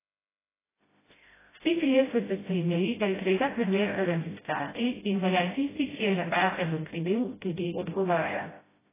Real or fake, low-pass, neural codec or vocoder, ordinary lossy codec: fake; 3.6 kHz; codec, 16 kHz, 0.5 kbps, FreqCodec, smaller model; AAC, 16 kbps